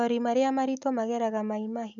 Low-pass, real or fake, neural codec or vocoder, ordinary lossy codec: 7.2 kHz; real; none; none